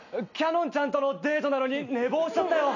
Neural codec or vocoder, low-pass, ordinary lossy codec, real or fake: none; 7.2 kHz; none; real